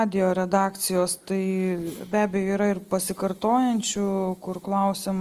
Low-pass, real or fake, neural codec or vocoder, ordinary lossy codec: 14.4 kHz; real; none; Opus, 24 kbps